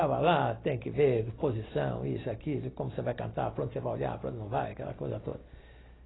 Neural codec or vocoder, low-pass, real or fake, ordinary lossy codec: none; 7.2 kHz; real; AAC, 16 kbps